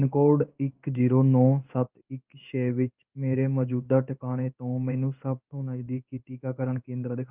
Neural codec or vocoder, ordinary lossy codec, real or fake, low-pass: codec, 16 kHz in and 24 kHz out, 1 kbps, XY-Tokenizer; Opus, 24 kbps; fake; 3.6 kHz